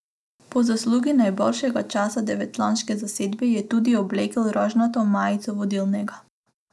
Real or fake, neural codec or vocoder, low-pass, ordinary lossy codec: real; none; none; none